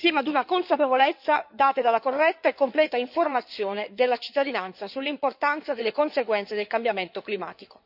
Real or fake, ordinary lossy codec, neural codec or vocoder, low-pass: fake; none; codec, 16 kHz in and 24 kHz out, 2.2 kbps, FireRedTTS-2 codec; 5.4 kHz